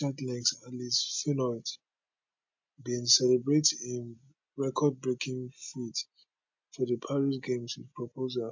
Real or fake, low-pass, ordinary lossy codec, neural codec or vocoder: real; 7.2 kHz; MP3, 48 kbps; none